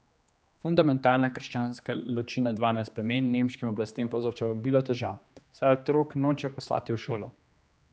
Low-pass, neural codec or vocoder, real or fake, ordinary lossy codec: none; codec, 16 kHz, 2 kbps, X-Codec, HuBERT features, trained on general audio; fake; none